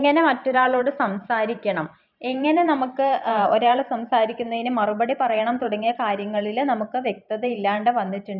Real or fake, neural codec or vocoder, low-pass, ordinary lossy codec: fake; vocoder, 44.1 kHz, 128 mel bands every 512 samples, BigVGAN v2; 5.4 kHz; none